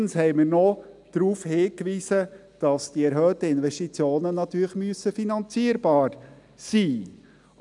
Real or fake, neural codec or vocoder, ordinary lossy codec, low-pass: real; none; none; 10.8 kHz